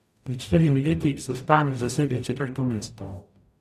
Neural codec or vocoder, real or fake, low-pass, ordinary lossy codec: codec, 44.1 kHz, 0.9 kbps, DAC; fake; 14.4 kHz; none